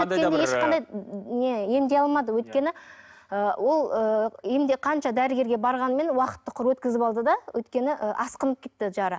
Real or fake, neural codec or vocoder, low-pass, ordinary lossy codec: real; none; none; none